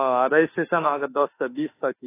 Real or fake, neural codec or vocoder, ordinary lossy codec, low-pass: fake; autoencoder, 48 kHz, 32 numbers a frame, DAC-VAE, trained on Japanese speech; AAC, 24 kbps; 3.6 kHz